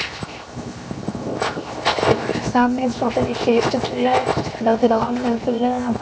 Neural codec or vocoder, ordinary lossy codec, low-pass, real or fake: codec, 16 kHz, 0.7 kbps, FocalCodec; none; none; fake